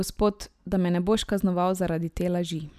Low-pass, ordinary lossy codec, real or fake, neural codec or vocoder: 14.4 kHz; none; real; none